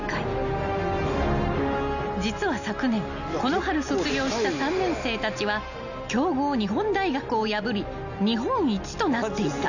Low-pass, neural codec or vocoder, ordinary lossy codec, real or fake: 7.2 kHz; none; none; real